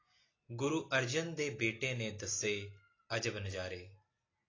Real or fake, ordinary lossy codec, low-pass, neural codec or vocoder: real; AAC, 32 kbps; 7.2 kHz; none